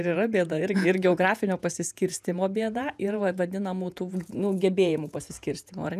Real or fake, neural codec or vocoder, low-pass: fake; vocoder, 48 kHz, 128 mel bands, Vocos; 14.4 kHz